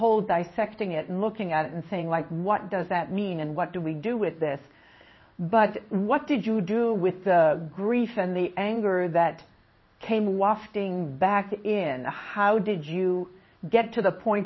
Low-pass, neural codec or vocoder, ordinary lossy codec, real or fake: 7.2 kHz; codec, 16 kHz in and 24 kHz out, 1 kbps, XY-Tokenizer; MP3, 24 kbps; fake